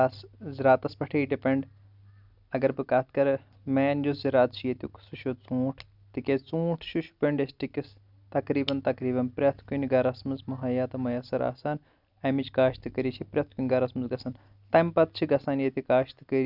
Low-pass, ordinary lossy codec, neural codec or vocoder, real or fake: 5.4 kHz; none; none; real